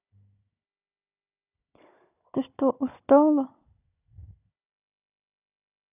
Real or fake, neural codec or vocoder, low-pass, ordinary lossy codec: fake; codec, 16 kHz, 16 kbps, FunCodec, trained on Chinese and English, 50 frames a second; 3.6 kHz; none